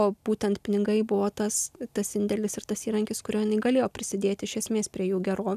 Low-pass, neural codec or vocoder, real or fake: 14.4 kHz; none; real